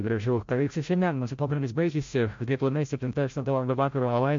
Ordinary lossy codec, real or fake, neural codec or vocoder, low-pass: MP3, 48 kbps; fake; codec, 16 kHz, 0.5 kbps, FreqCodec, larger model; 7.2 kHz